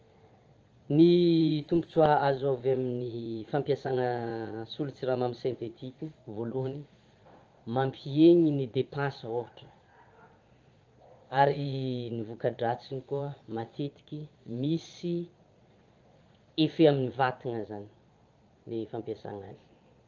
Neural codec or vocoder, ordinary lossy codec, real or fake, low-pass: vocoder, 22.05 kHz, 80 mel bands, Vocos; Opus, 24 kbps; fake; 7.2 kHz